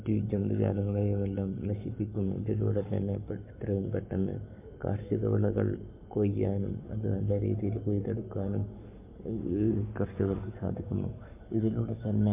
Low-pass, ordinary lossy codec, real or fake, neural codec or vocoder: 3.6 kHz; MP3, 32 kbps; fake; codec, 44.1 kHz, 7.8 kbps, Pupu-Codec